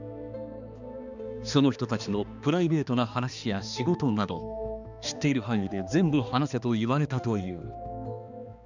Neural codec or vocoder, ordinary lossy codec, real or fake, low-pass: codec, 16 kHz, 2 kbps, X-Codec, HuBERT features, trained on balanced general audio; none; fake; 7.2 kHz